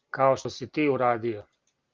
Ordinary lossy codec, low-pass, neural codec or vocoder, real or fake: Opus, 24 kbps; 7.2 kHz; none; real